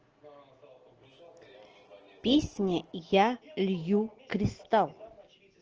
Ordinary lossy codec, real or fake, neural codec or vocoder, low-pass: Opus, 16 kbps; real; none; 7.2 kHz